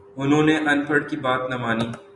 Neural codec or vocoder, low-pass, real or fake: none; 10.8 kHz; real